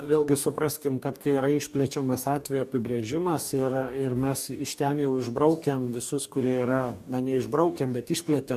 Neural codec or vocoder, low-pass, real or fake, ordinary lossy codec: codec, 44.1 kHz, 2.6 kbps, DAC; 14.4 kHz; fake; MP3, 96 kbps